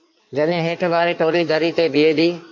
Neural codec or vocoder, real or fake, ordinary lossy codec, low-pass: codec, 16 kHz in and 24 kHz out, 1.1 kbps, FireRedTTS-2 codec; fake; MP3, 48 kbps; 7.2 kHz